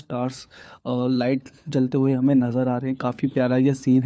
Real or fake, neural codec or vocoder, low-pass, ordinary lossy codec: fake; codec, 16 kHz, 4 kbps, FunCodec, trained on LibriTTS, 50 frames a second; none; none